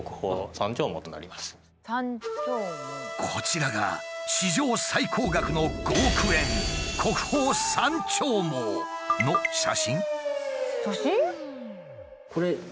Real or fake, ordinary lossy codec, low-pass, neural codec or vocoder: real; none; none; none